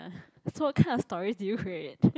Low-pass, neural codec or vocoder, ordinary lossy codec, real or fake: none; none; none; real